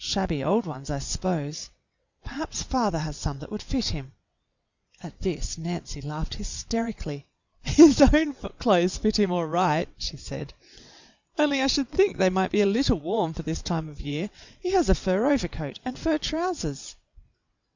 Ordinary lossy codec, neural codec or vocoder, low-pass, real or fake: Opus, 64 kbps; vocoder, 22.05 kHz, 80 mel bands, WaveNeXt; 7.2 kHz; fake